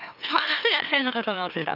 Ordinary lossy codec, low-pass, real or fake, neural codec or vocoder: none; 5.4 kHz; fake; autoencoder, 44.1 kHz, a latent of 192 numbers a frame, MeloTTS